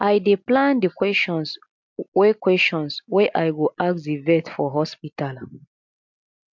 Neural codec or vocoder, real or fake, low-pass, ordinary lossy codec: none; real; 7.2 kHz; MP3, 64 kbps